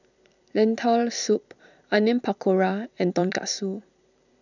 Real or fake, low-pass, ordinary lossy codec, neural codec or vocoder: fake; 7.2 kHz; none; vocoder, 44.1 kHz, 128 mel bands every 256 samples, BigVGAN v2